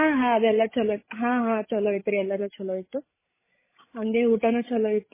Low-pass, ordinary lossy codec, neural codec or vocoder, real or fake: 3.6 kHz; MP3, 16 kbps; codec, 16 kHz, 16 kbps, FreqCodec, larger model; fake